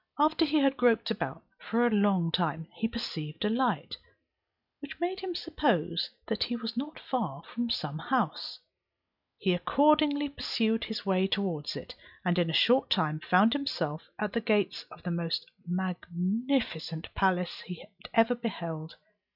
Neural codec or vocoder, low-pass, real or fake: none; 5.4 kHz; real